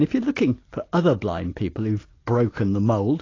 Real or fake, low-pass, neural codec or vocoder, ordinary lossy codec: real; 7.2 kHz; none; AAC, 32 kbps